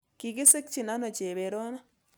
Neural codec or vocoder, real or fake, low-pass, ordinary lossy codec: none; real; none; none